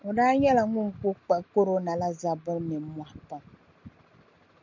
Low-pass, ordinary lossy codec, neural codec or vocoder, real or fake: 7.2 kHz; MP3, 64 kbps; none; real